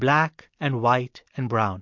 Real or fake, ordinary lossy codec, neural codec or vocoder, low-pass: real; MP3, 48 kbps; none; 7.2 kHz